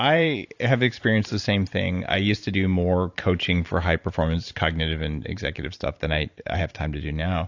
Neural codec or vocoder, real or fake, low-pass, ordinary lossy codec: vocoder, 44.1 kHz, 128 mel bands every 512 samples, BigVGAN v2; fake; 7.2 kHz; AAC, 48 kbps